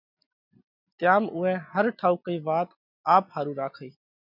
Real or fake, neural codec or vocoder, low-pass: real; none; 5.4 kHz